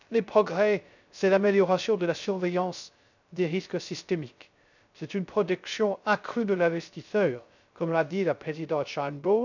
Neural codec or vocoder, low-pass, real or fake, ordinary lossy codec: codec, 16 kHz, 0.3 kbps, FocalCodec; 7.2 kHz; fake; none